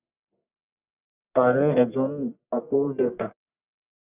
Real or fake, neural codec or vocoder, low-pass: fake; codec, 44.1 kHz, 1.7 kbps, Pupu-Codec; 3.6 kHz